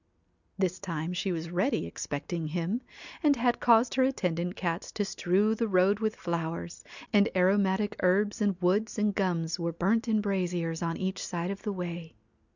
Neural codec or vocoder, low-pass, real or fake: none; 7.2 kHz; real